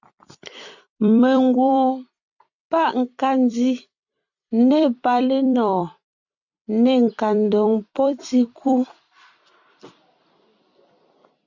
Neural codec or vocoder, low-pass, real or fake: vocoder, 44.1 kHz, 128 mel bands every 256 samples, BigVGAN v2; 7.2 kHz; fake